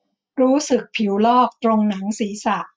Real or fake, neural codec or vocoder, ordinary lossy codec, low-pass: real; none; none; none